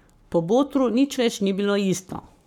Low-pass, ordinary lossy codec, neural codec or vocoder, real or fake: 19.8 kHz; none; codec, 44.1 kHz, 7.8 kbps, Pupu-Codec; fake